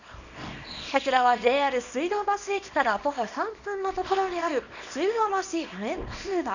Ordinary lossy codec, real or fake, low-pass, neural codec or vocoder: none; fake; 7.2 kHz; codec, 24 kHz, 0.9 kbps, WavTokenizer, small release